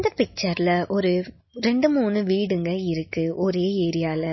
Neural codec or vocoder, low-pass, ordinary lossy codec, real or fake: none; 7.2 kHz; MP3, 24 kbps; real